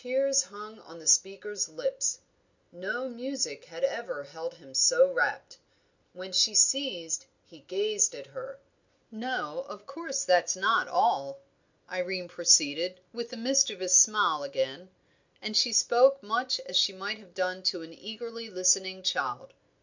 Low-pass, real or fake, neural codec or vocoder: 7.2 kHz; real; none